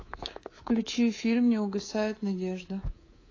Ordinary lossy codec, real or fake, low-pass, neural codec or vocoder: AAC, 32 kbps; fake; 7.2 kHz; codec, 24 kHz, 3.1 kbps, DualCodec